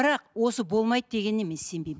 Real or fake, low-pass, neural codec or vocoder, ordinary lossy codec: real; none; none; none